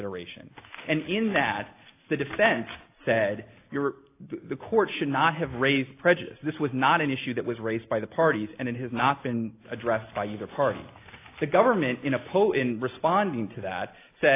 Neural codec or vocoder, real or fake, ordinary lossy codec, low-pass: none; real; AAC, 24 kbps; 3.6 kHz